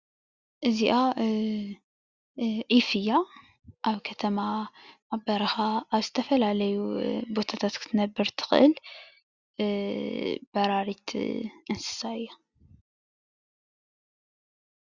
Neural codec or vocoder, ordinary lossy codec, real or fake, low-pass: none; Opus, 64 kbps; real; 7.2 kHz